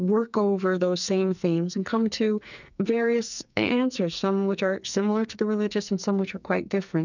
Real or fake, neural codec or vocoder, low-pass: fake; codec, 44.1 kHz, 2.6 kbps, SNAC; 7.2 kHz